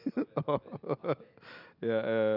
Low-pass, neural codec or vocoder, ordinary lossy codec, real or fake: 5.4 kHz; none; none; real